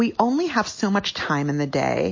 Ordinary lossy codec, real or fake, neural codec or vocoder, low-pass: MP3, 32 kbps; real; none; 7.2 kHz